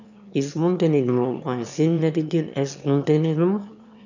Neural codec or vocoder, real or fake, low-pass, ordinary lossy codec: autoencoder, 22.05 kHz, a latent of 192 numbers a frame, VITS, trained on one speaker; fake; 7.2 kHz; none